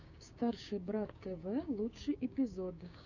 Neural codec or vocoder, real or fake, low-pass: codec, 16 kHz, 6 kbps, DAC; fake; 7.2 kHz